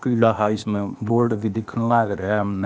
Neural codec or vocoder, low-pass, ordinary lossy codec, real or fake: codec, 16 kHz, 0.8 kbps, ZipCodec; none; none; fake